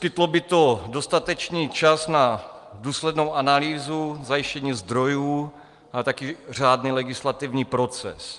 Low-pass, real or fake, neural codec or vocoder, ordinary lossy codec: 10.8 kHz; real; none; Opus, 32 kbps